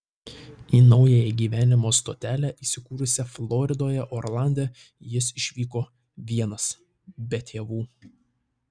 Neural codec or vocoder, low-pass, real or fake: none; 9.9 kHz; real